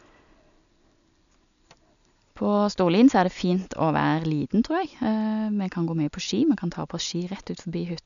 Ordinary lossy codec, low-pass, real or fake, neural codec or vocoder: none; 7.2 kHz; real; none